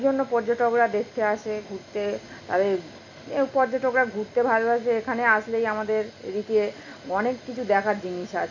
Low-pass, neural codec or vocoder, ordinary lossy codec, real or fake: 7.2 kHz; none; none; real